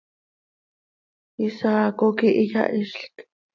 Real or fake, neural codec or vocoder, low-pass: real; none; 7.2 kHz